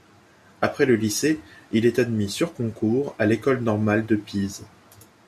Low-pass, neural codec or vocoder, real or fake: 14.4 kHz; none; real